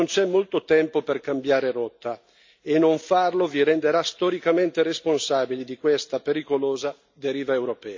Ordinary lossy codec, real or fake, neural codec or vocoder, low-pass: MP3, 64 kbps; real; none; 7.2 kHz